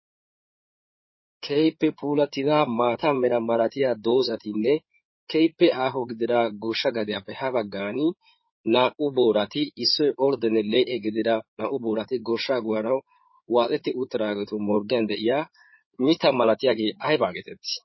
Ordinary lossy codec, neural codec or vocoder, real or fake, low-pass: MP3, 24 kbps; codec, 16 kHz in and 24 kHz out, 2.2 kbps, FireRedTTS-2 codec; fake; 7.2 kHz